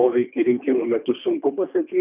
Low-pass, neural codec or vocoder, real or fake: 3.6 kHz; codec, 24 kHz, 3 kbps, HILCodec; fake